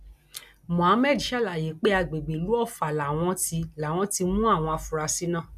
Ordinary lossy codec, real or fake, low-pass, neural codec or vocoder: none; real; 14.4 kHz; none